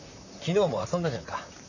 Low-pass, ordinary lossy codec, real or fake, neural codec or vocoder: 7.2 kHz; none; fake; vocoder, 44.1 kHz, 128 mel bands, Pupu-Vocoder